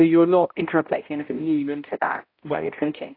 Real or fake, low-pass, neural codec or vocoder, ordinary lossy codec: fake; 5.4 kHz; codec, 16 kHz, 0.5 kbps, X-Codec, HuBERT features, trained on balanced general audio; AAC, 32 kbps